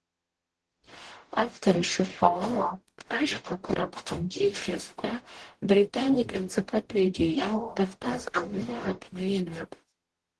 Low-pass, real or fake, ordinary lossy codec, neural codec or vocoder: 10.8 kHz; fake; Opus, 16 kbps; codec, 44.1 kHz, 0.9 kbps, DAC